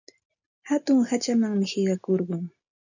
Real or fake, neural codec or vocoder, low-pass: real; none; 7.2 kHz